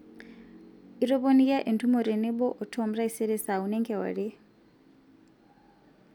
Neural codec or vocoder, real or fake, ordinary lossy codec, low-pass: none; real; none; 19.8 kHz